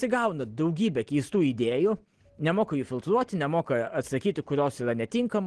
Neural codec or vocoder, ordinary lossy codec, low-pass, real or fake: none; Opus, 16 kbps; 10.8 kHz; real